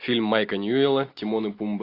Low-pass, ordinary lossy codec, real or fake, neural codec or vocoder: 5.4 kHz; AAC, 32 kbps; real; none